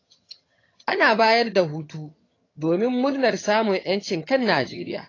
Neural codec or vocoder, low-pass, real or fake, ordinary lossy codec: vocoder, 22.05 kHz, 80 mel bands, HiFi-GAN; 7.2 kHz; fake; AAC, 32 kbps